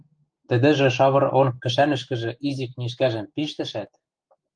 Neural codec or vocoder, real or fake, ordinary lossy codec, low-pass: autoencoder, 48 kHz, 128 numbers a frame, DAC-VAE, trained on Japanese speech; fake; Opus, 24 kbps; 9.9 kHz